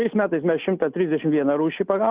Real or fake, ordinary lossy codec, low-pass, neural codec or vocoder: real; Opus, 32 kbps; 3.6 kHz; none